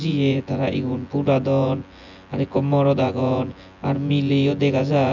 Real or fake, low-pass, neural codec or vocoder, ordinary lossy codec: fake; 7.2 kHz; vocoder, 24 kHz, 100 mel bands, Vocos; none